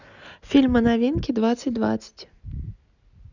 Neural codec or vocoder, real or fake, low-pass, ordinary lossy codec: none; real; 7.2 kHz; none